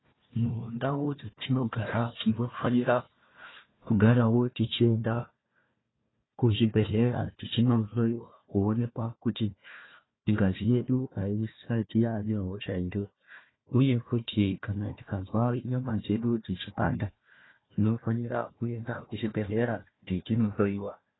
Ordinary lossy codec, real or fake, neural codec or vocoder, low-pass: AAC, 16 kbps; fake; codec, 16 kHz, 1 kbps, FunCodec, trained on Chinese and English, 50 frames a second; 7.2 kHz